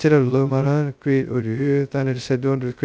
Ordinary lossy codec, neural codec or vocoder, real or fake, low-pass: none; codec, 16 kHz, 0.2 kbps, FocalCodec; fake; none